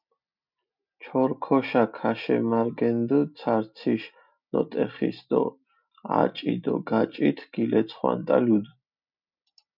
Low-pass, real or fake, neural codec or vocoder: 5.4 kHz; real; none